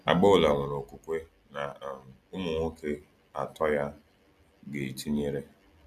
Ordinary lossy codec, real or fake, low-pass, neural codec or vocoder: none; real; 14.4 kHz; none